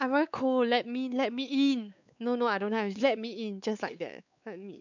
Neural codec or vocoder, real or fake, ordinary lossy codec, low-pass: codec, 16 kHz, 4 kbps, X-Codec, WavLM features, trained on Multilingual LibriSpeech; fake; none; 7.2 kHz